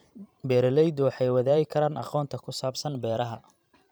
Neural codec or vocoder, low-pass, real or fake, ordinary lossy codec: vocoder, 44.1 kHz, 128 mel bands every 512 samples, BigVGAN v2; none; fake; none